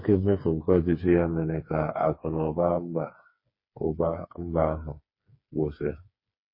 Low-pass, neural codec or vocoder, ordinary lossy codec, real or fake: 5.4 kHz; codec, 16 kHz, 4 kbps, FreqCodec, smaller model; MP3, 24 kbps; fake